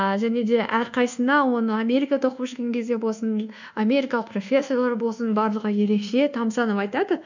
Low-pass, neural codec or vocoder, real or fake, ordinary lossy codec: 7.2 kHz; codec, 24 kHz, 1.2 kbps, DualCodec; fake; none